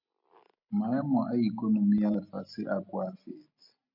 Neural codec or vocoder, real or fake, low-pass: none; real; 5.4 kHz